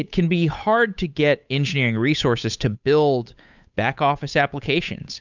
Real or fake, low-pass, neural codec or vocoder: fake; 7.2 kHz; codec, 16 kHz, 8 kbps, FunCodec, trained on Chinese and English, 25 frames a second